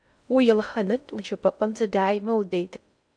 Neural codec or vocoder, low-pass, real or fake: codec, 16 kHz in and 24 kHz out, 0.6 kbps, FocalCodec, streaming, 4096 codes; 9.9 kHz; fake